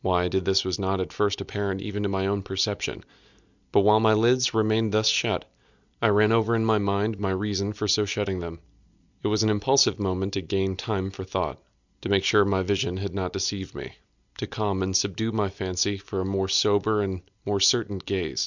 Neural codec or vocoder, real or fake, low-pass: none; real; 7.2 kHz